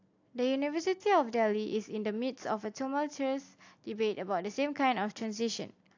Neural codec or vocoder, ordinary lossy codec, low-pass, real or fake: none; AAC, 48 kbps; 7.2 kHz; real